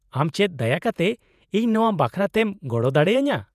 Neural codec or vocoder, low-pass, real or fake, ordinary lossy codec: vocoder, 48 kHz, 128 mel bands, Vocos; 14.4 kHz; fake; none